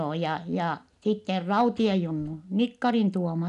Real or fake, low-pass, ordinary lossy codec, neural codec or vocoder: real; 10.8 kHz; none; none